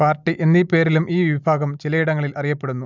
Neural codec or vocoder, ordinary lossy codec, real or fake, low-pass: none; none; real; 7.2 kHz